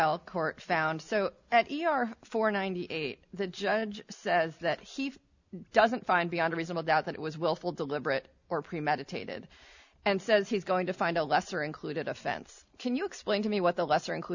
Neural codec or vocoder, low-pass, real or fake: none; 7.2 kHz; real